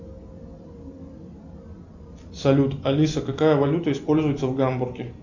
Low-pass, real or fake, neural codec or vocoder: 7.2 kHz; real; none